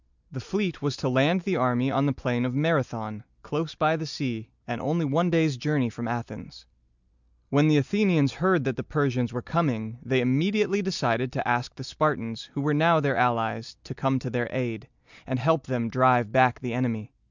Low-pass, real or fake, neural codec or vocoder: 7.2 kHz; real; none